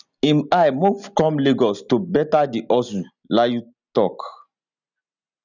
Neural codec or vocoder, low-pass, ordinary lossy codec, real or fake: none; 7.2 kHz; none; real